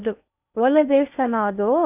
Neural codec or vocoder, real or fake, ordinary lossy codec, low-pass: codec, 16 kHz in and 24 kHz out, 0.6 kbps, FocalCodec, streaming, 2048 codes; fake; none; 3.6 kHz